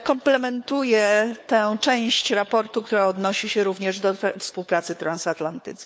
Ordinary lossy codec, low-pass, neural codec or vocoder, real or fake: none; none; codec, 16 kHz, 4 kbps, FunCodec, trained on LibriTTS, 50 frames a second; fake